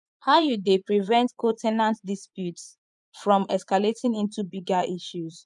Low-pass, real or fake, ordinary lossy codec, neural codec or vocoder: 10.8 kHz; fake; none; vocoder, 24 kHz, 100 mel bands, Vocos